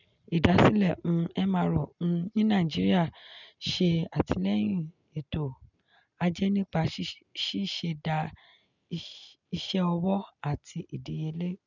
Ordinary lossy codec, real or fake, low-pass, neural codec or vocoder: none; real; 7.2 kHz; none